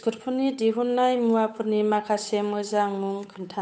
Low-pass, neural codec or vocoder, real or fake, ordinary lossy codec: none; codec, 16 kHz, 8 kbps, FunCodec, trained on Chinese and English, 25 frames a second; fake; none